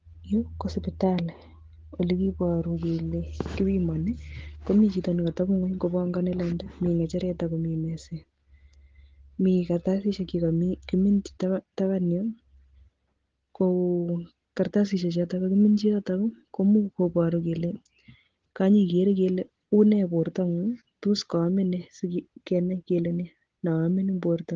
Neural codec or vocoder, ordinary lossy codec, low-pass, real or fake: none; Opus, 16 kbps; 7.2 kHz; real